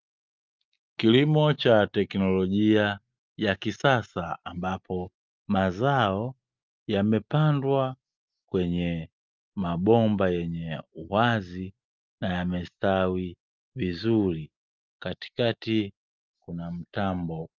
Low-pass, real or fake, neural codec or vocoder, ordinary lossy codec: 7.2 kHz; real; none; Opus, 24 kbps